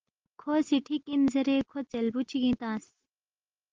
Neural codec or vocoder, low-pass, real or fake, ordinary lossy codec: none; 7.2 kHz; real; Opus, 32 kbps